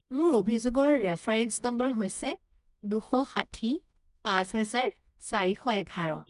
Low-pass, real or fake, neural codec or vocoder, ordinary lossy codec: 10.8 kHz; fake; codec, 24 kHz, 0.9 kbps, WavTokenizer, medium music audio release; none